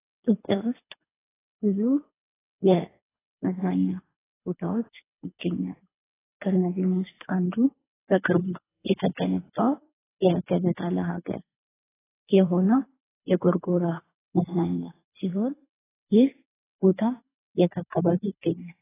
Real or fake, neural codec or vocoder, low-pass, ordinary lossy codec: fake; codec, 24 kHz, 3 kbps, HILCodec; 3.6 kHz; AAC, 16 kbps